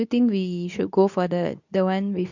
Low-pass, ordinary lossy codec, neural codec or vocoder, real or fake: 7.2 kHz; none; codec, 24 kHz, 0.9 kbps, WavTokenizer, medium speech release version 2; fake